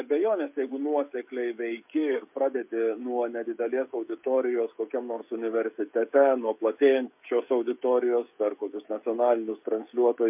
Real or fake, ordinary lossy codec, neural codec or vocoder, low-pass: fake; MP3, 32 kbps; codec, 16 kHz, 16 kbps, FreqCodec, smaller model; 3.6 kHz